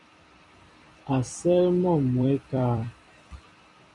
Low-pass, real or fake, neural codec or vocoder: 10.8 kHz; fake; vocoder, 44.1 kHz, 128 mel bands every 256 samples, BigVGAN v2